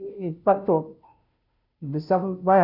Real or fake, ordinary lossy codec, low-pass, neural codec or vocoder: fake; none; 5.4 kHz; codec, 16 kHz, 0.5 kbps, FunCodec, trained on Chinese and English, 25 frames a second